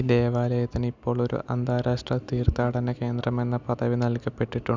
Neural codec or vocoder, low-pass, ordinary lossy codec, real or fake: none; 7.2 kHz; Opus, 64 kbps; real